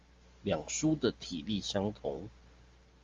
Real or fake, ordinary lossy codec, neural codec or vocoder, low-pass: real; Opus, 32 kbps; none; 7.2 kHz